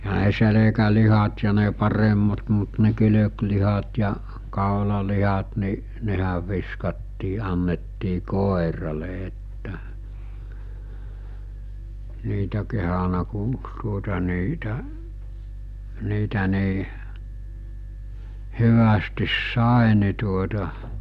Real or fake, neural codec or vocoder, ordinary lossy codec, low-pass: real; none; none; 14.4 kHz